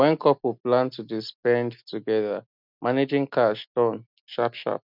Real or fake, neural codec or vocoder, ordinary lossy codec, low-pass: real; none; none; 5.4 kHz